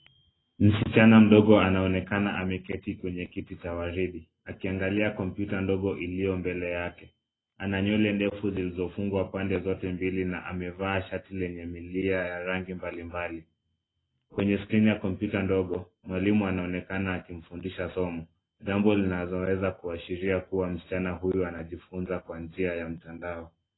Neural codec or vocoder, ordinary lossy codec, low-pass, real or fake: none; AAC, 16 kbps; 7.2 kHz; real